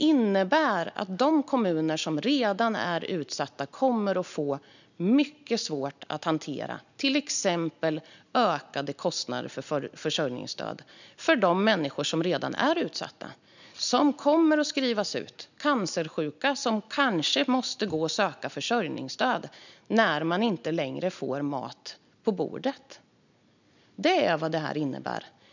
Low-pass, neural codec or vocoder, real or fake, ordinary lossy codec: 7.2 kHz; none; real; none